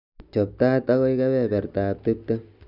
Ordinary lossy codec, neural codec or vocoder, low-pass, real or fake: none; none; 5.4 kHz; real